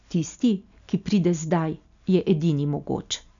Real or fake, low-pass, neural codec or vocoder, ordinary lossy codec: real; 7.2 kHz; none; none